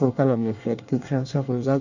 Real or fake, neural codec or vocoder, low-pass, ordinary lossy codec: fake; codec, 24 kHz, 1 kbps, SNAC; 7.2 kHz; none